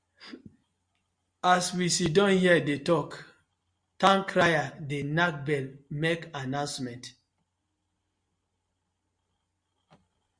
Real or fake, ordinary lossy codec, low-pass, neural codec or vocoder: real; Opus, 64 kbps; 9.9 kHz; none